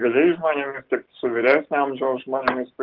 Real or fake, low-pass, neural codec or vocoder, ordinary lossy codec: real; 5.4 kHz; none; Opus, 16 kbps